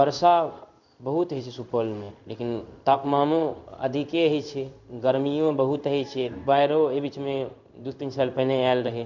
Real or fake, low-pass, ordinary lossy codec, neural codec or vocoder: fake; 7.2 kHz; none; codec, 16 kHz in and 24 kHz out, 1 kbps, XY-Tokenizer